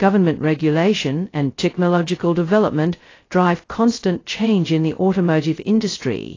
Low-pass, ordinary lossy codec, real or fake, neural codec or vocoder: 7.2 kHz; AAC, 32 kbps; fake; codec, 16 kHz, 0.2 kbps, FocalCodec